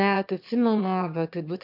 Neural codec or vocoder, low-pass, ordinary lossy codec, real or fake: autoencoder, 22.05 kHz, a latent of 192 numbers a frame, VITS, trained on one speaker; 5.4 kHz; MP3, 48 kbps; fake